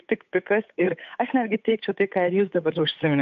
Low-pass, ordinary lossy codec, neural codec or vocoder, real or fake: 7.2 kHz; AAC, 64 kbps; codec, 16 kHz, 8 kbps, FunCodec, trained on Chinese and English, 25 frames a second; fake